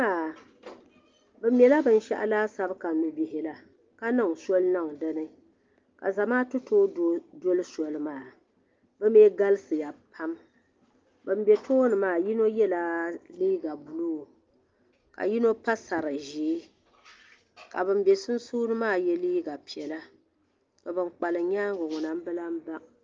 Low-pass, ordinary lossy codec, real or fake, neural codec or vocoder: 7.2 kHz; Opus, 24 kbps; real; none